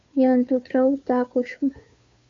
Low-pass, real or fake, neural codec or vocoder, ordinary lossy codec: 7.2 kHz; fake; codec, 16 kHz, 2 kbps, FunCodec, trained on Chinese and English, 25 frames a second; AAC, 48 kbps